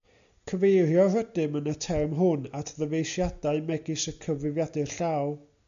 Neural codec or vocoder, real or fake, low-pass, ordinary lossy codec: none; real; 7.2 kHz; AAC, 96 kbps